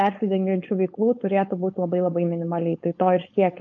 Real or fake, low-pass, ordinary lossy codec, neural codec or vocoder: fake; 7.2 kHz; MP3, 48 kbps; codec, 16 kHz, 4.8 kbps, FACodec